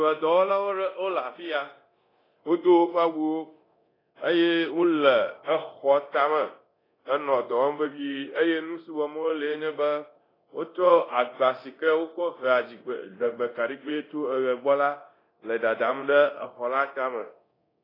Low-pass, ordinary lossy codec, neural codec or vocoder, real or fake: 5.4 kHz; AAC, 24 kbps; codec, 24 kHz, 0.9 kbps, DualCodec; fake